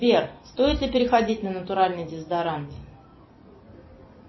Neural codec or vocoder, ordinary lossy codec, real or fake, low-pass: none; MP3, 24 kbps; real; 7.2 kHz